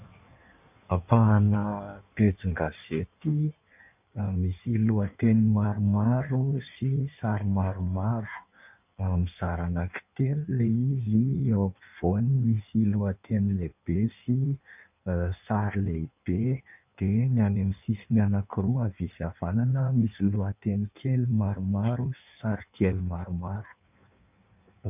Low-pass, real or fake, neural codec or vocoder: 3.6 kHz; fake; codec, 16 kHz in and 24 kHz out, 1.1 kbps, FireRedTTS-2 codec